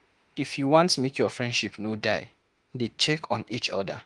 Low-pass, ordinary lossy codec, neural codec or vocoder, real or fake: 10.8 kHz; Opus, 24 kbps; autoencoder, 48 kHz, 32 numbers a frame, DAC-VAE, trained on Japanese speech; fake